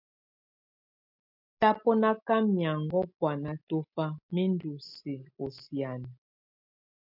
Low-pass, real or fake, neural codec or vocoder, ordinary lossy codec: 5.4 kHz; real; none; MP3, 48 kbps